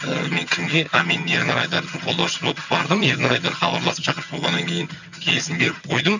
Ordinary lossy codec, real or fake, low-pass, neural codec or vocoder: none; fake; 7.2 kHz; vocoder, 22.05 kHz, 80 mel bands, HiFi-GAN